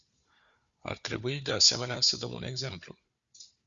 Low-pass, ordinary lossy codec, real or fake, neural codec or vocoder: 7.2 kHz; Opus, 64 kbps; fake; codec, 16 kHz, 4 kbps, FunCodec, trained on Chinese and English, 50 frames a second